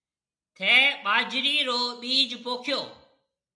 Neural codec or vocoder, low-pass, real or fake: none; 9.9 kHz; real